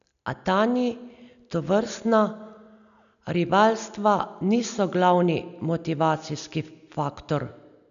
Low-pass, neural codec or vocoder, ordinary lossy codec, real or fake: 7.2 kHz; none; none; real